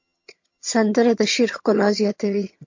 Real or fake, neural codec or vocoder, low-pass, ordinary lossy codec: fake; vocoder, 22.05 kHz, 80 mel bands, HiFi-GAN; 7.2 kHz; MP3, 48 kbps